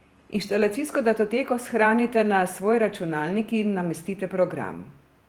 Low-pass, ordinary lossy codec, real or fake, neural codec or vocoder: 19.8 kHz; Opus, 24 kbps; fake; vocoder, 48 kHz, 128 mel bands, Vocos